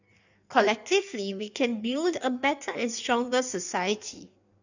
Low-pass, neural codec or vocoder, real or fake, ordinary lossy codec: 7.2 kHz; codec, 16 kHz in and 24 kHz out, 1.1 kbps, FireRedTTS-2 codec; fake; none